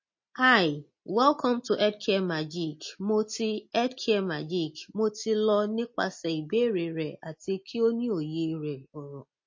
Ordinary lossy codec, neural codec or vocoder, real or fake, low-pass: MP3, 32 kbps; none; real; 7.2 kHz